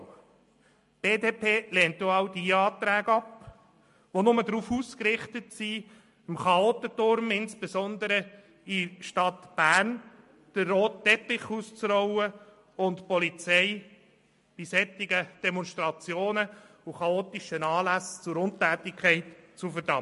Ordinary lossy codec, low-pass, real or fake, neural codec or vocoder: MP3, 48 kbps; 14.4 kHz; real; none